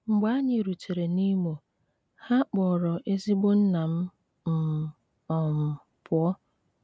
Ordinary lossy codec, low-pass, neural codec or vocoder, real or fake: none; none; none; real